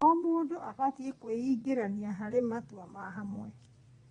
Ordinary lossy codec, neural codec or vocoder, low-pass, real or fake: AAC, 32 kbps; vocoder, 22.05 kHz, 80 mel bands, Vocos; 9.9 kHz; fake